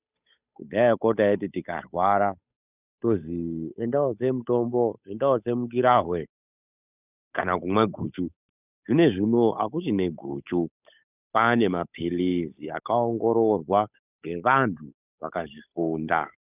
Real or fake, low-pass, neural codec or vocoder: fake; 3.6 kHz; codec, 16 kHz, 8 kbps, FunCodec, trained on Chinese and English, 25 frames a second